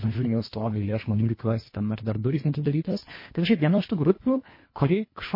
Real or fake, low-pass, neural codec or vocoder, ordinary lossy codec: fake; 5.4 kHz; codec, 24 kHz, 1.5 kbps, HILCodec; MP3, 24 kbps